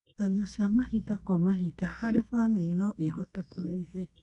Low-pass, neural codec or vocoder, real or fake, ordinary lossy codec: 10.8 kHz; codec, 24 kHz, 0.9 kbps, WavTokenizer, medium music audio release; fake; none